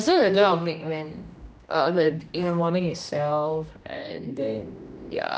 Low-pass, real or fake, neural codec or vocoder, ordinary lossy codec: none; fake; codec, 16 kHz, 1 kbps, X-Codec, HuBERT features, trained on general audio; none